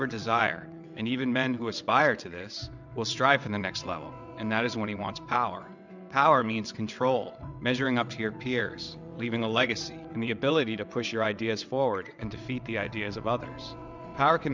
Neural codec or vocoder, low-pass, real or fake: vocoder, 22.05 kHz, 80 mel bands, WaveNeXt; 7.2 kHz; fake